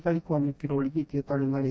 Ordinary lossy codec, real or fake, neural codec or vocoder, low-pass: none; fake; codec, 16 kHz, 1 kbps, FreqCodec, smaller model; none